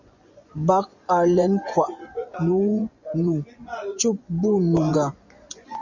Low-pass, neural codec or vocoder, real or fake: 7.2 kHz; vocoder, 24 kHz, 100 mel bands, Vocos; fake